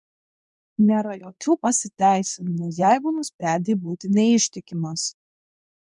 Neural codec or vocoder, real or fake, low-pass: codec, 24 kHz, 0.9 kbps, WavTokenizer, medium speech release version 2; fake; 10.8 kHz